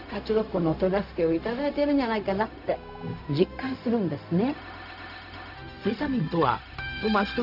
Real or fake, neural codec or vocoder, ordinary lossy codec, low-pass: fake; codec, 16 kHz, 0.4 kbps, LongCat-Audio-Codec; none; 5.4 kHz